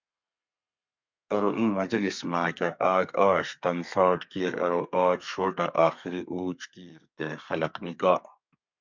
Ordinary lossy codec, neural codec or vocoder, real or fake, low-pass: MP3, 64 kbps; codec, 32 kHz, 1.9 kbps, SNAC; fake; 7.2 kHz